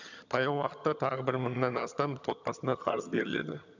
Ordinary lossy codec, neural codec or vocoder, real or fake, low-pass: none; vocoder, 22.05 kHz, 80 mel bands, HiFi-GAN; fake; 7.2 kHz